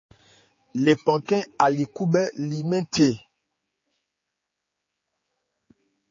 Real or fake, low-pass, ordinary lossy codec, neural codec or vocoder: fake; 7.2 kHz; MP3, 32 kbps; codec, 16 kHz, 4 kbps, X-Codec, HuBERT features, trained on general audio